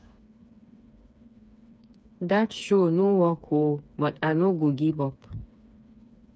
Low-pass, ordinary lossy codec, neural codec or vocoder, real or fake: none; none; codec, 16 kHz, 4 kbps, FreqCodec, smaller model; fake